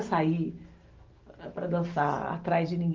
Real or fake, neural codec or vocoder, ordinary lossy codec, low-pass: real; none; Opus, 24 kbps; 7.2 kHz